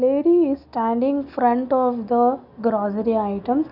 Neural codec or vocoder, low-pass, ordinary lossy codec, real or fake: none; 5.4 kHz; none; real